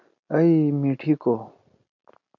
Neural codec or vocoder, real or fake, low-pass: none; real; 7.2 kHz